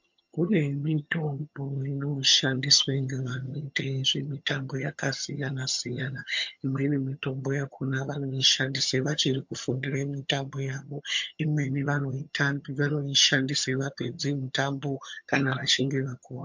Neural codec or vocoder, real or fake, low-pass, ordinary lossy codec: vocoder, 22.05 kHz, 80 mel bands, HiFi-GAN; fake; 7.2 kHz; MP3, 48 kbps